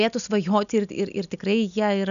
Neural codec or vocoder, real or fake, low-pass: none; real; 7.2 kHz